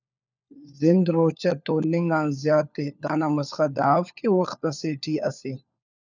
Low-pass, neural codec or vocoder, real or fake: 7.2 kHz; codec, 16 kHz, 4 kbps, FunCodec, trained on LibriTTS, 50 frames a second; fake